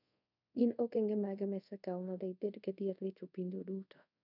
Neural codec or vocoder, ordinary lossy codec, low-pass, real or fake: codec, 24 kHz, 0.5 kbps, DualCodec; none; 5.4 kHz; fake